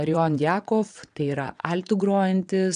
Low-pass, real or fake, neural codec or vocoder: 9.9 kHz; fake; vocoder, 22.05 kHz, 80 mel bands, WaveNeXt